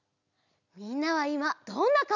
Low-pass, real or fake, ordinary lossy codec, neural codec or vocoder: 7.2 kHz; real; none; none